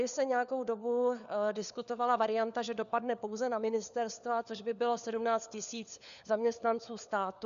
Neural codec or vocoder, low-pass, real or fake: codec, 16 kHz, 4 kbps, FunCodec, trained on LibriTTS, 50 frames a second; 7.2 kHz; fake